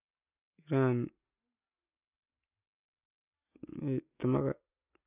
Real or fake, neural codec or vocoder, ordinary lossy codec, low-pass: real; none; none; 3.6 kHz